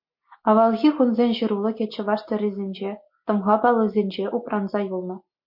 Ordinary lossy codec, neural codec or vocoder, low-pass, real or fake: MP3, 32 kbps; none; 5.4 kHz; real